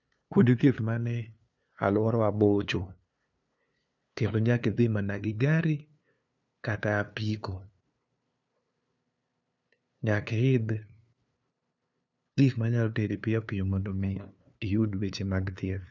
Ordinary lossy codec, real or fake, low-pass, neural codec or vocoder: none; fake; 7.2 kHz; codec, 16 kHz, 2 kbps, FunCodec, trained on LibriTTS, 25 frames a second